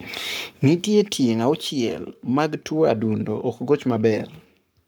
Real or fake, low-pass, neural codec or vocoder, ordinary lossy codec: fake; none; codec, 44.1 kHz, 7.8 kbps, Pupu-Codec; none